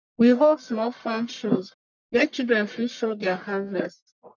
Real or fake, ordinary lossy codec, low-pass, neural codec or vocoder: fake; none; 7.2 kHz; codec, 44.1 kHz, 1.7 kbps, Pupu-Codec